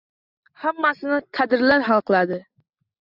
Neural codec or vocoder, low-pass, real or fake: none; 5.4 kHz; real